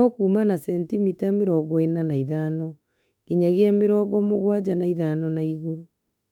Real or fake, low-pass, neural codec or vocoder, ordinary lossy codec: fake; 19.8 kHz; autoencoder, 48 kHz, 32 numbers a frame, DAC-VAE, trained on Japanese speech; none